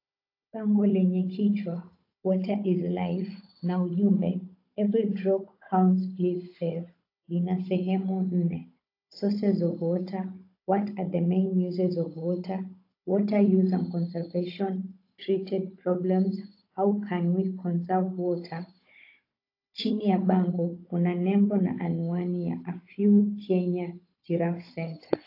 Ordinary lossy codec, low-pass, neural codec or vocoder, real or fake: AAC, 32 kbps; 5.4 kHz; codec, 16 kHz, 16 kbps, FunCodec, trained on Chinese and English, 50 frames a second; fake